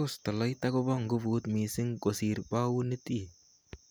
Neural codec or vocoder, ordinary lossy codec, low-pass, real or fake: none; none; none; real